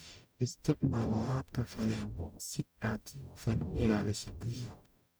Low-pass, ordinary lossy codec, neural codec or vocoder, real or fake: none; none; codec, 44.1 kHz, 0.9 kbps, DAC; fake